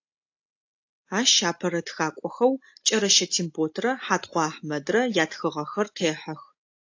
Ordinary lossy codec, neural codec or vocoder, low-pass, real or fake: AAC, 48 kbps; none; 7.2 kHz; real